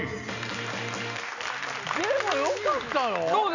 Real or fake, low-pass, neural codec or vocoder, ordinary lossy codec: real; 7.2 kHz; none; none